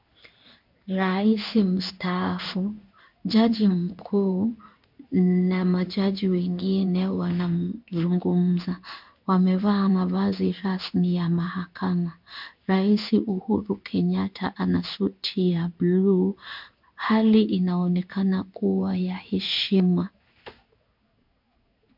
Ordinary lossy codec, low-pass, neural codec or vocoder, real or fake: MP3, 48 kbps; 5.4 kHz; codec, 16 kHz in and 24 kHz out, 1 kbps, XY-Tokenizer; fake